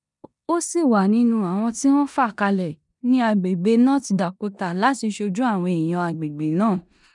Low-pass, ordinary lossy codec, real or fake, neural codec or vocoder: 10.8 kHz; none; fake; codec, 16 kHz in and 24 kHz out, 0.9 kbps, LongCat-Audio-Codec, four codebook decoder